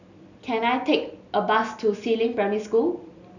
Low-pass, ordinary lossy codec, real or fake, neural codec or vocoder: 7.2 kHz; none; real; none